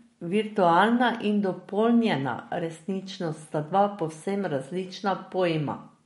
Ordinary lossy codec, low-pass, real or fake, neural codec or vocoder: MP3, 48 kbps; 19.8 kHz; real; none